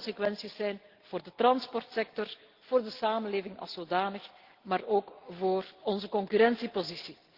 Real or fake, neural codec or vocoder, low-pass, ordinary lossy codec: real; none; 5.4 kHz; Opus, 16 kbps